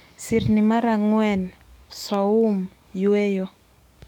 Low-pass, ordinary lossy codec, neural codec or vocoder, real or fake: 19.8 kHz; none; codec, 44.1 kHz, 7.8 kbps, DAC; fake